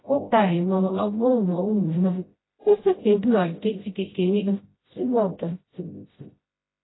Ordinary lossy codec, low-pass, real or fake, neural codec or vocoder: AAC, 16 kbps; 7.2 kHz; fake; codec, 16 kHz, 0.5 kbps, FreqCodec, smaller model